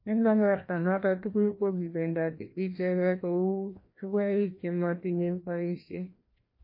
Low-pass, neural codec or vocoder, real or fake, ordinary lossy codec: 5.4 kHz; codec, 16 kHz, 1 kbps, FreqCodec, larger model; fake; MP3, 32 kbps